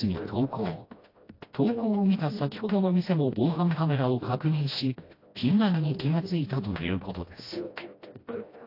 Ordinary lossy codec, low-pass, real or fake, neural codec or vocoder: AAC, 32 kbps; 5.4 kHz; fake; codec, 16 kHz, 1 kbps, FreqCodec, smaller model